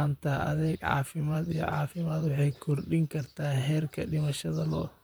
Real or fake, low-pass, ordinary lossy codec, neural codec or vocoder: fake; none; none; vocoder, 44.1 kHz, 128 mel bands, Pupu-Vocoder